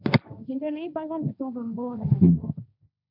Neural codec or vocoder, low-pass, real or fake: codec, 16 kHz, 1.1 kbps, Voila-Tokenizer; 5.4 kHz; fake